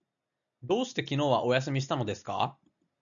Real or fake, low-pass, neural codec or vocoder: real; 7.2 kHz; none